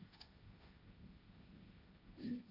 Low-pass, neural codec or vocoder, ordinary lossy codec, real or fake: 5.4 kHz; codec, 16 kHz, 1.1 kbps, Voila-Tokenizer; AAC, 24 kbps; fake